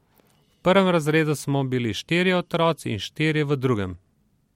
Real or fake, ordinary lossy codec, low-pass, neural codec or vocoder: real; MP3, 64 kbps; 19.8 kHz; none